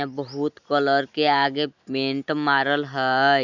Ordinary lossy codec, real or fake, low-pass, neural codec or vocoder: none; real; 7.2 kHz; none